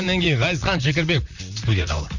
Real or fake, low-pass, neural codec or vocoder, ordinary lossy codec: fake; 7.2 kHz; vocoder, 44.1 kHz, 128 mel bands, Pupu-Vocoder; none